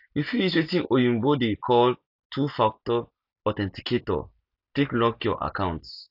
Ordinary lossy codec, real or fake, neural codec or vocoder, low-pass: AAC, 48 kbps; real; none; 5.4 kHz